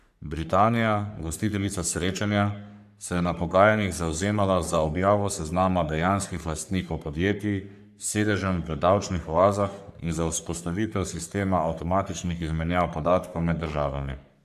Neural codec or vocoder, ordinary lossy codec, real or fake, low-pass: codec, 44.1 kHz, 3.4 kbps, Pupu-Codec; AAC, 96 kbps; fake; 14.4 kHz